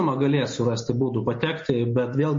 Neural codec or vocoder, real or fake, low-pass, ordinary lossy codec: none; real; 7.2 kHz; MP3, 32 kbps